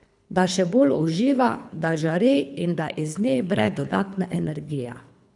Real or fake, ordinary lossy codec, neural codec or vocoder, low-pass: fake; none; codec, 24 kHz, 3 kbps, HILCodec; 10.8 kHz